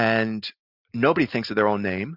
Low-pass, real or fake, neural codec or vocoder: 5.4 kHz; real; none